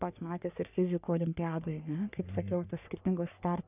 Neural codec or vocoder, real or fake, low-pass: codec, 44.1 kHz, 3.4 kbps, Pupu-Codec; fake; 3.6 kHz